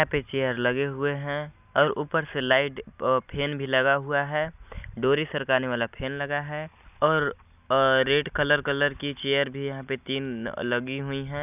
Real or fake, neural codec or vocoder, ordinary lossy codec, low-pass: real; none; none; 3.6 kHz